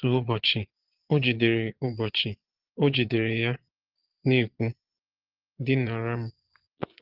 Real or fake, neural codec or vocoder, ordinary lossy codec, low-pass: fake; vocoder, 22.05 kHz, 80 mel bands, Vocos; Opus, 16 kbps; 5.4 kHz